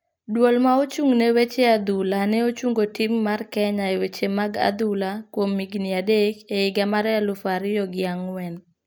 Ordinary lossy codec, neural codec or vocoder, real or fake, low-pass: none; none; real; none